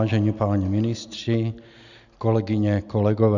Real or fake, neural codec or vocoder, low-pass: real; none; 7.2 kHz